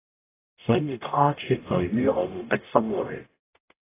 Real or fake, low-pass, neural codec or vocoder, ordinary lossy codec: fake; 3.6 kHz; codec, 44.1 kHz, 0.9 kbps, DAC; AAC, 16 kbps